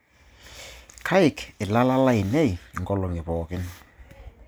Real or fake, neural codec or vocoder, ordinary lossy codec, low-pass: real; none; none; none